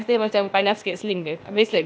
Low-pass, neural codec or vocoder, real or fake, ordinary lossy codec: none; codec, 16 kHz, 0.8 kbps, ZipCodec; fake; none